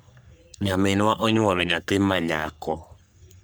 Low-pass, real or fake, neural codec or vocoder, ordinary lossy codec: none; fake; codec, 44.1 kHz, 3.4 kbps, Pupu-Codec; none